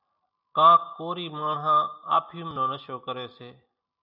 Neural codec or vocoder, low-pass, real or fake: none; 5.4 kHz; real